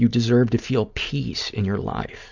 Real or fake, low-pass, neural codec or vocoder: real; 7.2 kHz; none